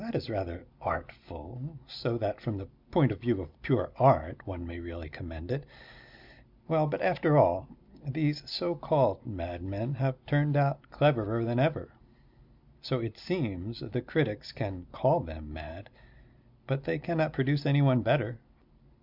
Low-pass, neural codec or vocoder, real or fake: 5.4 kHz; none; real